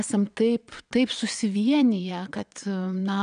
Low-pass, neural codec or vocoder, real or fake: 9.9 kHz; vocoder, 22.05 kHz, 80 mel bands, WaveNeXt; fake